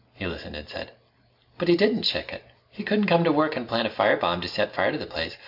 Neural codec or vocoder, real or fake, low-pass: none; real; 5.4 kHz